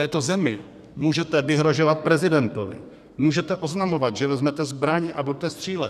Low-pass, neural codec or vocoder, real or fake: 14.4 kHz; codec, 32 kHz, 1.9 kbps, SNAC; fake